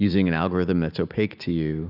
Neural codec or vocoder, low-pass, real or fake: none; 5.4 kHz; real